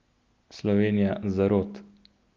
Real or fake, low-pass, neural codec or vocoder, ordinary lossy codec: real; 7.2 kHz; none; Opus, 32 kbps